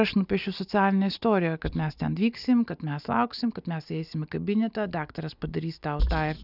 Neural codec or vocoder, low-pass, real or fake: none; 5.4 kHz; real